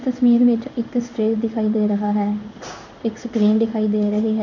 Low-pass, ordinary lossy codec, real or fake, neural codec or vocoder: 7.2 kHz; none; fake; codec, 16 kHz in and 24 kHz out, 1 kbps, XY-Tokenizer